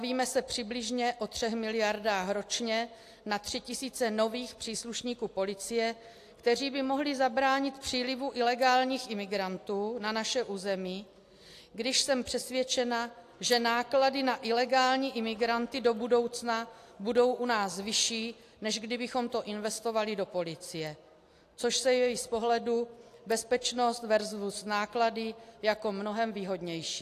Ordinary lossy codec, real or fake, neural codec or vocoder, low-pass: AAC, 64 kbps; real; none; 14.4 kHz